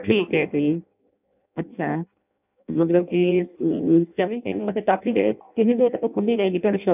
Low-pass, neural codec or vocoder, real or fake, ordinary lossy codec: 3.6 kHz; codec, 16 kHz in and 24 kHz out, 0.6 kbps, FireRedTTS-2 codec; fake; none